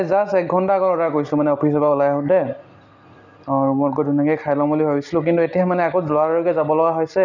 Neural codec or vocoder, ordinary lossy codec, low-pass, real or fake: none; none; 7.2 kHz; real